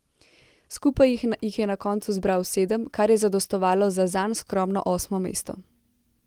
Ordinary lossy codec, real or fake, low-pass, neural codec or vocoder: Opus, 24 kbps; real; 19.8 kHz; none